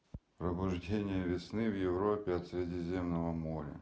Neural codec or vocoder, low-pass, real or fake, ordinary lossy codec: none; none; real; none